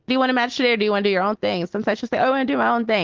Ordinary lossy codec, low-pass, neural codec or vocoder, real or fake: Opus, 16 kbps; 7.2 kHz; none; real